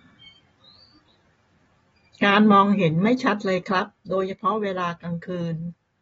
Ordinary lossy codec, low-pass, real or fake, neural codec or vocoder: AAC, 24 kbps; 19.8 kHz; real; none